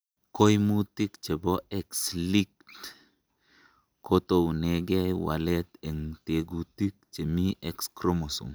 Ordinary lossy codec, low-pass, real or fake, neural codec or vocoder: none; none; real; none